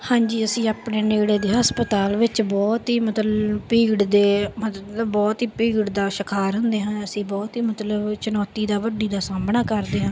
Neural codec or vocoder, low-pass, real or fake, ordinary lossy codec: none; none; real; none